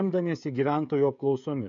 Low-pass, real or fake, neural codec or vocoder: 7.2 kHz; fake; codec, 16 kHz, 16 kbps, FreqCodec, smaller model